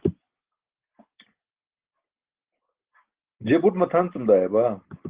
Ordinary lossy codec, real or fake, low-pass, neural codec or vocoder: Opus, 16 kbps; real; 3.6 kHz; none